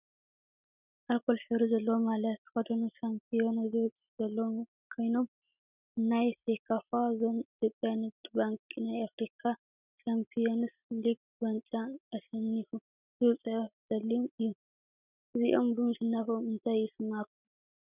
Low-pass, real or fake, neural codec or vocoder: 3.6 kHz; real; none